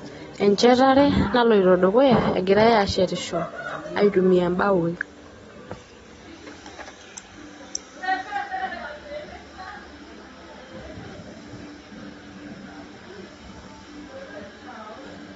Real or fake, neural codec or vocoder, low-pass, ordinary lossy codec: real; none; 19.8 kHz; AAC, 24 kbps